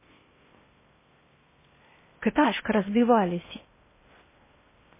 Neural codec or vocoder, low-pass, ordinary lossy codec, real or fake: codec, 16 kHz in and 24 kHz out, 0.6 kbps, FocalCodec, streaming, 4096 codes; 3.6 kHz; MP3, 16 kbps; fake